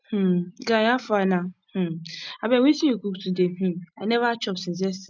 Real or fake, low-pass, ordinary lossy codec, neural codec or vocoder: real; 7.2 kHz; none; none